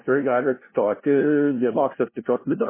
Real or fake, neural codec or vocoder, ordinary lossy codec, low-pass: fake; codec, 16 kHz, 0.5 kbps, FunCodec, trained on LibriTTS, 25 frames a second; MP3, 16 kbps; 3.6 kHz